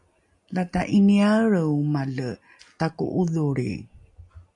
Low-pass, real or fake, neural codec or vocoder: 10.8 kHz; real; none